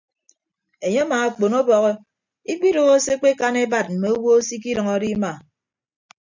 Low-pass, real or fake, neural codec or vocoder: 7.2 kHz; real; none